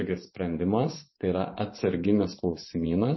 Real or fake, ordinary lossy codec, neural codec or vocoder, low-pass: fake; MP3, 24 kbps; vocoder, 24 kHz, 100 mel bands, Vocos; 7.2 kHz